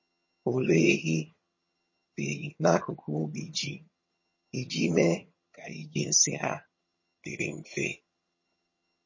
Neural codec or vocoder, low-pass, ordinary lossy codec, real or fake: vocoder, 22.05 kHz, 80 mel bands, HiFi-GAN; 7.2 kHz; MP3, 32 kbps; fake